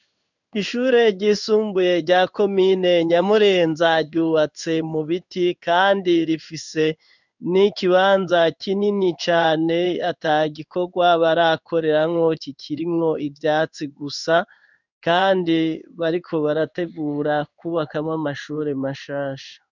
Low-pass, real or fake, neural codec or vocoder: 7.2 kHz; fake; codec, 16 kHz in and 24 kHz out, 1 kbps, XY-Tokenizer